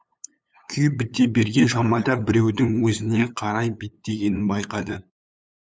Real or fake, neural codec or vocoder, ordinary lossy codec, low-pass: fake; codec, 16 kHz, 8 kbps, FunCodec, trained on LibriTTS, 25 frames a second; none; none